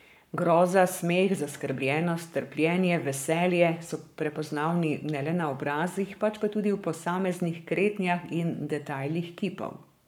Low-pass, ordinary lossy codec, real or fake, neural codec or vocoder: none; none; fake; codec, 44.1 kHz, 7.8 kbps, Pupu-Codec